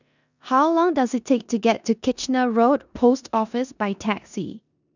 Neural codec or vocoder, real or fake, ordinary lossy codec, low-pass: codec, 16 kHz in and 24 kHz out, 0.9 kbps, LongCat-Audio-Codec, four codebook decoder; fake; none; 7.2 kHz